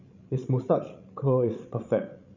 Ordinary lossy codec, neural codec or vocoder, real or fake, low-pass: none; codec, 16 kHz, 16 kbps, FreqCodec, larger model; fake; 7.2 kHz